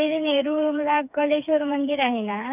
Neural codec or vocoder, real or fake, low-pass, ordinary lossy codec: codec, 16 kHz, 4 kbps, FreqCodec, smaller model; fake; 3.6 kHz; none